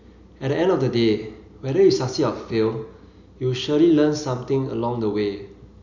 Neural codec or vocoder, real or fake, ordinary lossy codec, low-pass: none; real; none; 7.2 kHz